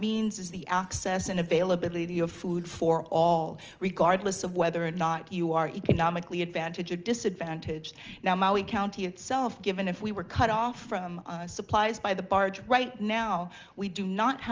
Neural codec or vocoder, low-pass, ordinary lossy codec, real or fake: none; 7.2 kHz; Opus, 24 kbps; real